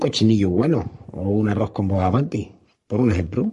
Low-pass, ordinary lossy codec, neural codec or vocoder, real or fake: 14.4 kHz; MP3, 48 kbps; codec, 44.1 kHz, 3.4 kbps, Pupu-Codec; fake